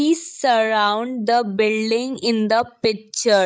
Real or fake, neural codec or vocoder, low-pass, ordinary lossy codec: fake; codec, 16 kHz, 16 kbps, FreqCodec, larger model; none; none